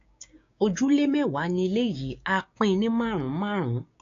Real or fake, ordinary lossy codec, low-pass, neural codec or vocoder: fake; AAC, 48 kbps; 7.2 kHz; codec, 16 kHz, 6 kbps, DAC